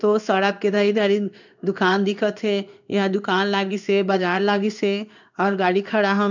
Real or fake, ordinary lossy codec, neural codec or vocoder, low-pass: fake; none; codec, 16 kHz in and 24 kHz out, 1 kbps, XY-Tokenizer; 7.2 kHz